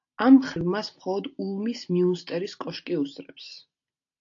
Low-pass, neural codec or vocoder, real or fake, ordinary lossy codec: 7.2 kHz; none; real; AAC, 48 kbps